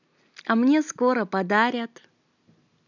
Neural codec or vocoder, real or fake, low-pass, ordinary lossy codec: none; real; 7.2 kHz; none